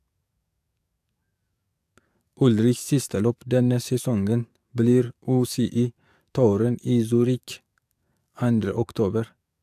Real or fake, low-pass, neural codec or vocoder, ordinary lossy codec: fake; 14.4 kHz; codec, 44.1 kHz, 7.8 kbps, DAC; AAC, 96 kbps